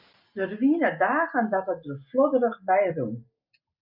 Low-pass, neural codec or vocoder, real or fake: 5.4 kHz; none; real